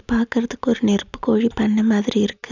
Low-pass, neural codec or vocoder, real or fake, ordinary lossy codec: 7.2 kHz; none; real; none